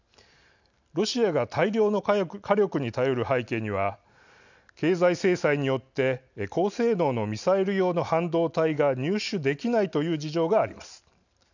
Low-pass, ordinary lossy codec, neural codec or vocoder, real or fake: 7.2 kHz; none; none; real